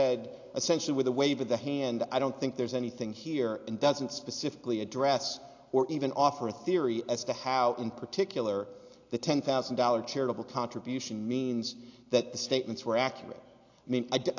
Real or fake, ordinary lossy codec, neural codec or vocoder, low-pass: real; AAC, 48 kbps; none; 7.2 kHz